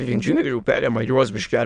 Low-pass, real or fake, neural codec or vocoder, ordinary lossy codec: 9.9 kHz; fake; autoencoder, 22.05 kHz, a latent of 192 numbers a frame, VITS, trained on many speakers; MP3, 96 kbps